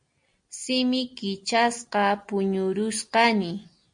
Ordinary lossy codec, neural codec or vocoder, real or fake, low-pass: MP3, 48 kbps; none; real; 9.9 kHz